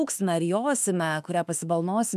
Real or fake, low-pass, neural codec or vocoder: fake; 14.4 kHz; autoencoder, 48 kHz, 32 numbers a frame, DAC-VAE, trained on Japanese speech